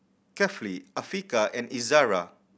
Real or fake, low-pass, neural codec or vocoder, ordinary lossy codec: real; none; none; none